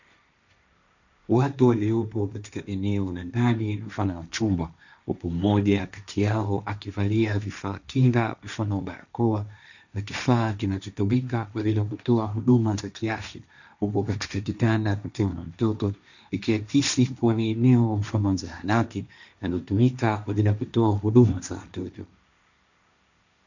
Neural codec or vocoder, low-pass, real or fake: codec, 16 kHz, 1.1 kbps, Voila-Tokenizer; 7.2 kHz; fake